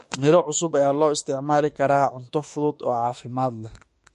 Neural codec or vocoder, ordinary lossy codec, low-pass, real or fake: autoencoder, 48 kHz, 32 numbers a frame, DAC-VAE, trained on Japanese speech; MP3, 48 kbps; 14.4 kHz; fake